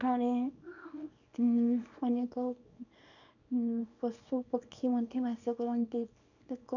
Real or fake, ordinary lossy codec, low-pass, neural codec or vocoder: fake; none; 7.2 kHz; codec, 24 kHz, 0.9 kbps, WavTokenizer, small release